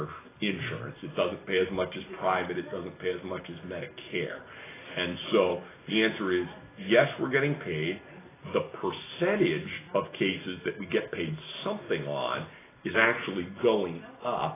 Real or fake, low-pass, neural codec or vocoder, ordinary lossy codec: real; 3.6 kHz; none; AAC, 16 kbps